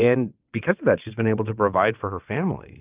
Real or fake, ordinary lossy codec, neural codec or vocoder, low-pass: fake; Opus, 24 kbps; vocoder, 22.05 kHz, 80 mel bands, WaveNeXt; 3.6 kHz